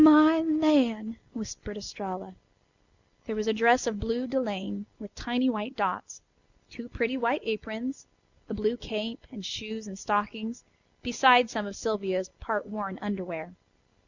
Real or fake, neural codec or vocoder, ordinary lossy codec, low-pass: real; none; Opus, 64 kbps; 7.2 kHz